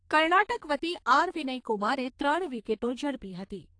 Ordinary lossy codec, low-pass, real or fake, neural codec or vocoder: AAC, 48 kbps; 9.9 kHz; fake; codec, 32 kHz, 1.9 kbps, SNAC